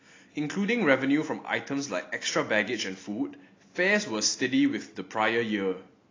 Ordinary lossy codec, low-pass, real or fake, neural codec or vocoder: AAC, 32 kbps; 7.2 kHz; real; none